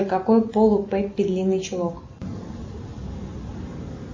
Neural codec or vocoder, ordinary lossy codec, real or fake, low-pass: none; MP3, 32 kbps; real; 7.2 kHz